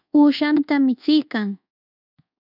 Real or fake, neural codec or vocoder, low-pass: fake; codec, 24 kHz, 1.2 kbps, DualCodec; 5.4 kHz